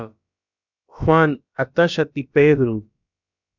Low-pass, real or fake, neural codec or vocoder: 7.2 kHz; fake; codec, 16 kHz, about 1 kbps, DyCAST, with the encoder's durations